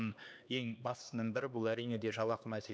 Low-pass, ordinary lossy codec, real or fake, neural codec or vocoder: none; none; fake; codec, 16 kHz, 2 kbps, X-Codec, HuBERT features, trained on LibriSpeech